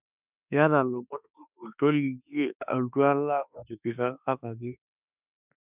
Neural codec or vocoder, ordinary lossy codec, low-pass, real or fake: autoencoder, 48 kHz, 32 numbers a frame, DAC-VAE, trained on Japanese speech; none; 3.6 kHz; fake